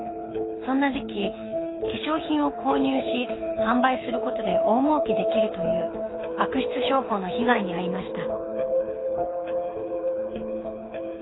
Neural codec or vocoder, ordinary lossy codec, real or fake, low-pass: codec, 24 kHz, 6 kbps, HILCodec; AAC, 16 kbps; fake; 7.2 kHz